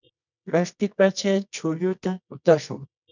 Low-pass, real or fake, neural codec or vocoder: 7.2 kHz; fake; codec, 24 kHz, 0.9 kbps, WavTokenizer, medium music audio release